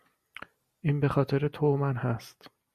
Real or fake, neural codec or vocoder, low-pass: real; none; 14.4 kHz